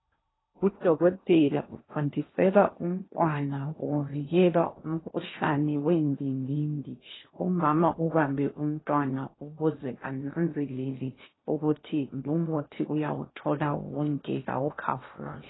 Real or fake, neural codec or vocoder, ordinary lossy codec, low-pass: fake; codec, 16 kHz in and 24 kHz out, 0.8 kbps, FocalCodec, streaming, 65536 codes; AAC, 16 kbps; 7.2 kHz